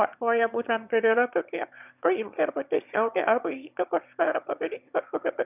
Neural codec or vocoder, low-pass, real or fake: autoencoder, 22.05 kHz, a latent of 192 numbers a frame, VITS, trained on one speaker; 3.6 kHz; fake